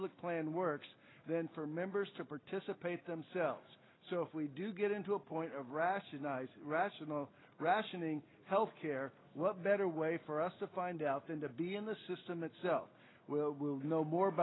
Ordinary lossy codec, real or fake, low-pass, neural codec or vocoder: AAC, 16 kbps; real; 7.2 kHz; none